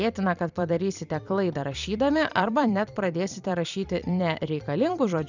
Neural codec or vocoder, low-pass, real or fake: vocoder, 44.1 kHz, 128 mel bands every 256 samples, BigVGAN v2; 7.2 kHz; fake